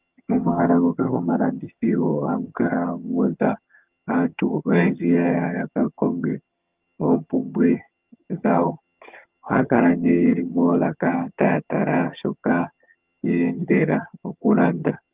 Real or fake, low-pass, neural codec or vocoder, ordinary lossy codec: fake; 3.6 kHz; vocoder, 22.05 kHz, 80 mel bands, HiFi-GAN; Opus, 24 kbps